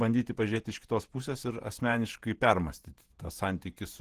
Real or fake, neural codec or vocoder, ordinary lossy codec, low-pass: fake; vocoder, 48 kHz, 128 mel bands, Vocos; Opus, 16 kbps; 14.4 kHz